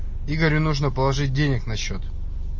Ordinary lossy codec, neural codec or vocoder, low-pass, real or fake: MP3, 32 kbps; none; 7.2 kHz; real